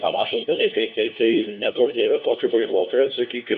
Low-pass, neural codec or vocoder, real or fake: 7.2 kHz; codec, 16 kHz, 1 kbps, FunCodec, trained on LibriTTS, 50 frames a second; fake